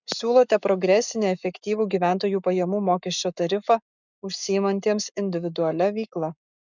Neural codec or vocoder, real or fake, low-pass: codec, 44.1 kHz, 7.8 kbps, Pupu-Codec; fake; 7.2 kHz